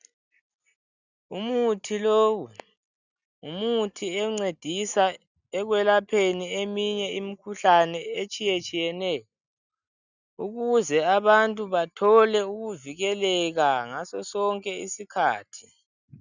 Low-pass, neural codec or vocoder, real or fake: 7.2 kHz; none; real